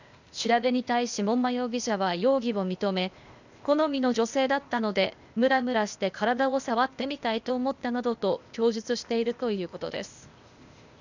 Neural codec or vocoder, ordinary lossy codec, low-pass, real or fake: codec, 16 kHz, 0.8 kbps, ZipCodec; none; 7.2 kHz; fake